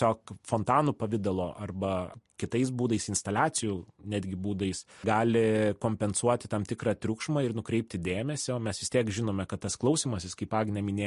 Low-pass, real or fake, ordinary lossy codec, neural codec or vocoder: 14.4 kHz; real; MP3, 48 kbps; none